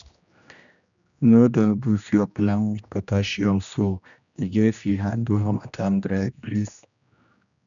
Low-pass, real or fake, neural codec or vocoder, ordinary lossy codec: 7.2 kHz; fake; codec, 16 kHz, 1 kbps, X-Codec, HuBERT features, trained on general audio; none